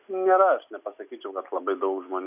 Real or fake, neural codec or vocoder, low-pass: real; none; 3.6 kHz